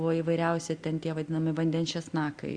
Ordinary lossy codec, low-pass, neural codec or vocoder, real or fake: Opus, 64 kbps; 9.9 kHz; none; real